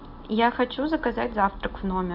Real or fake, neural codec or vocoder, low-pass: real; none; 5.4 kHz